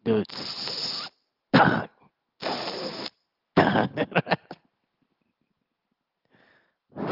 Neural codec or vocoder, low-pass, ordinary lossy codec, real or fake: none; 5.4 kHz; Opus, 32 kbps; real